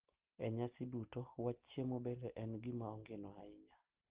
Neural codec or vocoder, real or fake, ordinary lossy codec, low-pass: none; real; Opus, 16 kbps; 3.6 kHz